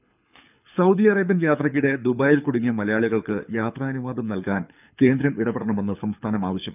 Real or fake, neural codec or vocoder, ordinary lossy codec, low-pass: fake; codec, 24 kHz, 6 kbps, HILCodec; none; 3.6 kHz